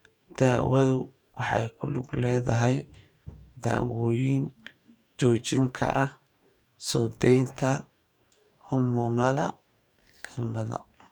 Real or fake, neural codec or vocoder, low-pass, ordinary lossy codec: fake; codec, 44.1 kHz, 2.6 kbps, DAC; 19.8 kHz; none